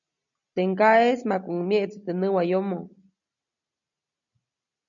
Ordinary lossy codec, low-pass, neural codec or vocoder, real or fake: MP3, 96 kbps; 7.2 kHz; none; real